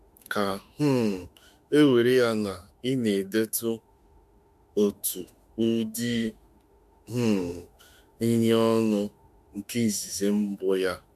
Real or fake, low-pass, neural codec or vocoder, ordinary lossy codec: fake; 14.4 kHz; autoencoder, 48 kHz, 32 numbers a frame, DAC-VAE, trained on Japanese speech; MP3, 96 kbps